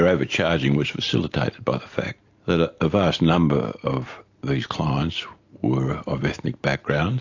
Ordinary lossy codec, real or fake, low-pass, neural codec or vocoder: AAC, 48 kbps; real; 7.2 kHz; none